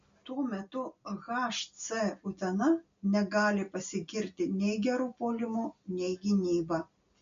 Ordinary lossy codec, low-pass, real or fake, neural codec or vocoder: MP3, 48 kbps; 7.2 kHz; real; none